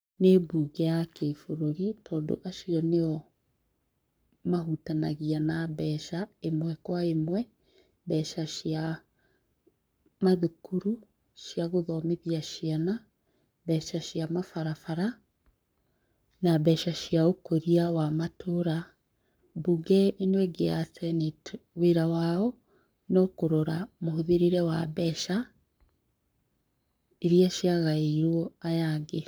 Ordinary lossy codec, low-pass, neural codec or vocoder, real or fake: none; none; codec, 44.1 kHz, 7.8 kbps, Pupu-Codec; fake